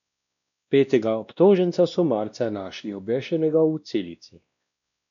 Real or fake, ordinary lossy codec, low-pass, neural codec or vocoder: fake; none; 7.2 kHz; codec, 16 kHz, 1 kbps, X-Codec, WavLM features, trained on Multilingual LibriSpeech